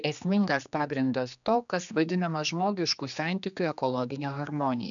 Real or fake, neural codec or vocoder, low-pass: fake; codec, 16 kHz, 2 kbps, X-Codec, HuBERT features, trained on general audio; 7.2 kHz